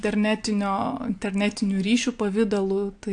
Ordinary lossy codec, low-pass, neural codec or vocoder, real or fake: AAC, 64 kbps; 9.9 kHz; none; real